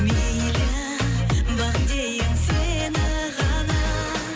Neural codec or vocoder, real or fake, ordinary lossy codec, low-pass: none; real; none; none